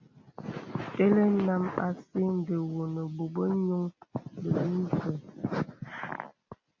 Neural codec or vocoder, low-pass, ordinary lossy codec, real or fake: none; 7.2 kHz; MP3, 48 kbps; real